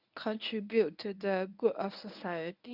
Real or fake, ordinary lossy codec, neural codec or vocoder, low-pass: fake; none; codec, 24 kHz, 0.9 kbps, WavTokenizer, medium speech release version 1; 5.4 kHz